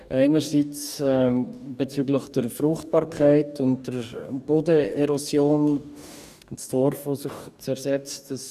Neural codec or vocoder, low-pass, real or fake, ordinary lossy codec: codec, 44.1 kHz, 2.6 kbps, DAC; 14.4 kHz; fake; none